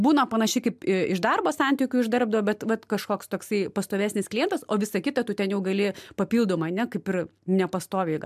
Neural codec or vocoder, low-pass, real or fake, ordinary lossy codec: none; 14.4 kHz; real; MP3, 96 kbps